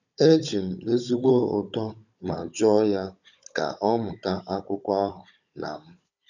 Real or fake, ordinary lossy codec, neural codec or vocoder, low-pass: fake; none; codec, 16 kHz, 16 kbps, FunCodec, trained on Chinese and English, 50 frames a second; 7.2 kHz